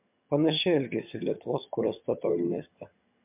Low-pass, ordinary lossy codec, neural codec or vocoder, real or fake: 3.6 kHz; MP3, 32 kbps; vocoder, 22.05 kHz, 80 mel bands, HiFi-GAN; fake